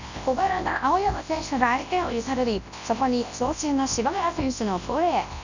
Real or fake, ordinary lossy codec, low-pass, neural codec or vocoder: fake; none; 7.2 kHz; codec, 24 kHz, 0.9 kbps, WavTokenizer, large speech release